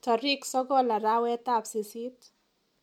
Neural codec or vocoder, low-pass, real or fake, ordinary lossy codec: none; 19.8 kHz; real; MP3, 96 kbps